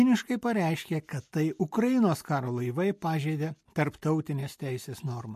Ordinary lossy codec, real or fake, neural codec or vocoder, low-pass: MP3, 64 kbps; real; none; 14.4 kHz